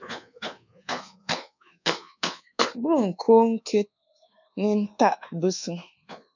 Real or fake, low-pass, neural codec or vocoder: fake; 7.2 kHz; codec, 24 kHz, 1.2 kbps, DualCodec